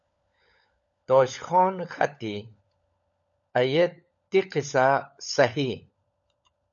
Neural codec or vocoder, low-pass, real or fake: codec, 16 kHz, 16 kbps, FunCodec, trained on LibriTTS, 50 frames a second; 7.2 kHz; fake